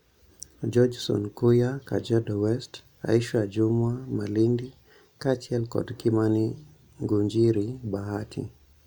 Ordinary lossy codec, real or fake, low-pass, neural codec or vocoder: none; real; 19.8 kHz; none